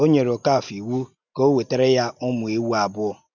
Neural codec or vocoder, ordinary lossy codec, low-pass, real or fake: none; none; 7.2 kHz; real